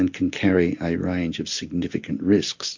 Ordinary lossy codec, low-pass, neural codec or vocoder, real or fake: MP3, 48 kbps; 7.2 kHz; none; real